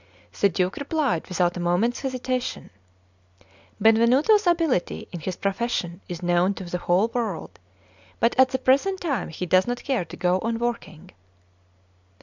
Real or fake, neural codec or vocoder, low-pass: real; none; 7.2 kHz